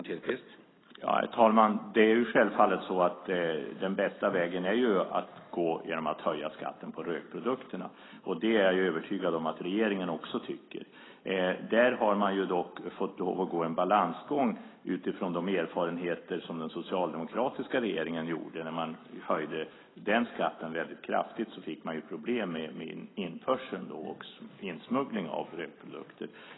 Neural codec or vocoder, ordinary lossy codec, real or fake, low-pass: none; AAC, 16 kbps; real; 7.2 kHz